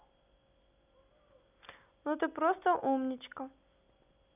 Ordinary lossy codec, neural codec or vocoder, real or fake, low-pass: none; none; real; 3.6 kHz